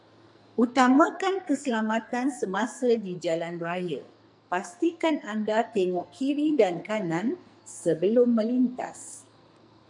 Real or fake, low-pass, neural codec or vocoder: fake; 10.8 kHz; codec, 44.1 kHz, 2.6 kbps, SNAC